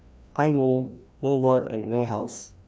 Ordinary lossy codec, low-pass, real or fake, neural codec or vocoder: none; none; fake; codec, 16 kHz, 1 kbps, FreqCodec, larger model